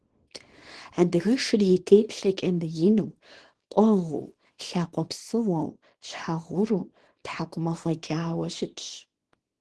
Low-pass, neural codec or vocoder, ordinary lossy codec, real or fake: 10.8 kHz; codec, 24 kHz, 0.9 kbps, WavTokenizer, small release; Opus, 16 kbps; fake